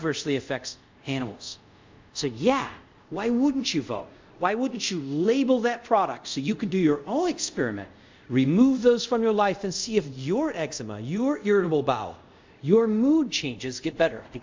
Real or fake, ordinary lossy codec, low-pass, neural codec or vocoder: fake; MP3, 64 kbps; 7.2 kHz; codec, 24 kHz, 0.5 kbps, DualCodec